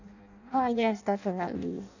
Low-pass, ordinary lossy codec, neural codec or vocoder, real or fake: 7.2 kHz; none; codec, 16 kHz in and 24 kHz out, 0.6 kbps, FireRedTTS-2 codec; fake